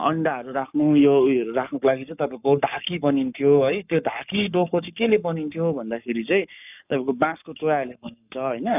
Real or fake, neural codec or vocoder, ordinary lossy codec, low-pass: fake; codec, 44.1 kHz, 7.8 kbps, Pupu-Codec; none; 3.6 kHz